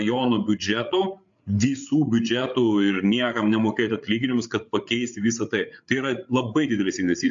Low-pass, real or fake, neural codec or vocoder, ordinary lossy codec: 7.2 kHz; fake; codec, 16 kHz, 8 kbps, FreqCodec, larger model; AAC, 64 kbps